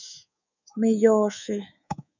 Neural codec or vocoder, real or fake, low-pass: codec, 24 kHz, 3.1 kbps, DualCodec; fake; 7.2 kHz